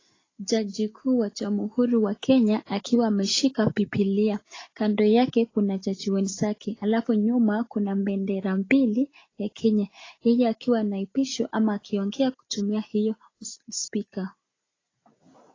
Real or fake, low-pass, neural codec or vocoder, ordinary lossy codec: real; 7.2 kHz; none; AAC, 32 kbps